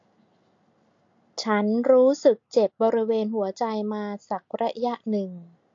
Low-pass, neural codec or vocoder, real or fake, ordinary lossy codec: 7.2 kHz; none; real; AAC, 48 kbps